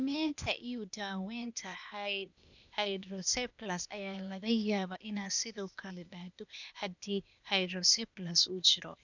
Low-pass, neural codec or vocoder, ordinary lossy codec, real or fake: 7.2 kHz; codec, 16 kHz, 0.8 kbps, ZipCodec; none; fake